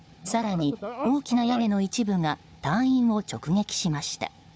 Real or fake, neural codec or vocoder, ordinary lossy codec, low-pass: fake; codec, 16 kHz, 4 kbps, FunCodec, trained on Chinese and English, 50 frames a second; none; none